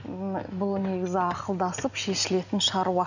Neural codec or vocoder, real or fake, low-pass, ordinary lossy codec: none; real; 7.2 kHz; none